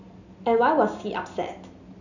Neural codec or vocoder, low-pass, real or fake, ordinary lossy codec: none; 7.2 kHz; real; none